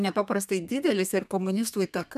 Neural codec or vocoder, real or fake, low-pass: codec, 32 kHz, 1.9 kbps, SNAC; fake; 14.4 kHz